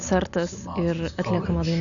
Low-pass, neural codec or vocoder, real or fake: 7.2 kHz; none; real